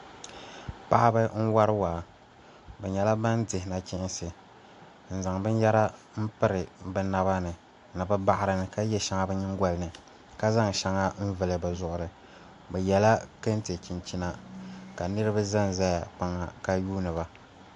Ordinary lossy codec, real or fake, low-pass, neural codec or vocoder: AAC, 64 kbps; real; 10.8 kHz; none